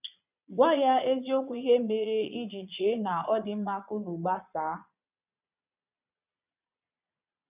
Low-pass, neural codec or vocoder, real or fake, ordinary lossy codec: 3.6 kHz; vocoder, 22.05 kHz, 80 mel bands, Vocos; fake; none